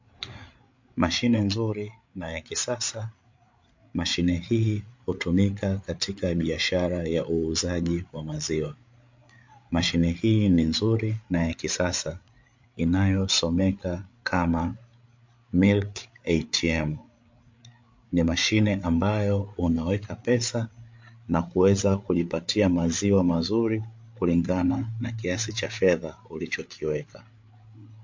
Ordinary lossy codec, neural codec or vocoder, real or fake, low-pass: MP3, 48 kbps; codec, 16 kHz, 8 kbps, FreqCodec, larger model; fake; 7.2 kHz